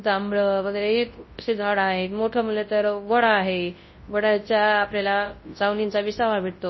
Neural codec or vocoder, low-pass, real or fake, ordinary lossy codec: codec, 24 kHz, 0.9 kbps, WavTokenizer, large speech release; 7.2 kHz; fake; MP3, 24 kbps